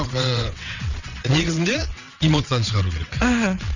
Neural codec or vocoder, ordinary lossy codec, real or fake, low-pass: vocoder, 22.05 kHz, 80 mel bands, WaveNeXt; none; fake; 7.2 kHz